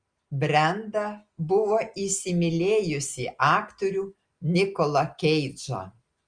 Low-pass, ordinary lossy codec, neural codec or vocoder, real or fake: 9.9 kHz; Opus, 32 kbps; none; real